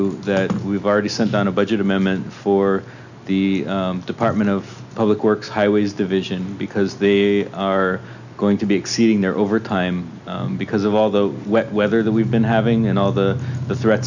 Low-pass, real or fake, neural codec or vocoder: 7.2 kHz; real; none